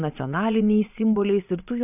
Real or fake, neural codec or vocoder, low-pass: fake; vocoder, 22.05 kHz, 80 mel bands, Vocos; 3.6 kHz